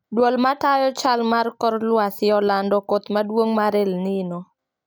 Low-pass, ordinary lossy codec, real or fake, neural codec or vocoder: none; none; real; none